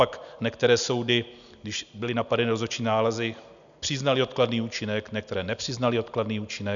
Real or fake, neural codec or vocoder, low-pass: real; none; 7.2 kHz